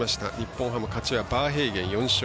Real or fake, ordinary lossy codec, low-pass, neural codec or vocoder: real; none; none; none